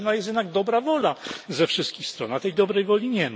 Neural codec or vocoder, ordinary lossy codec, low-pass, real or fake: none; none; none; real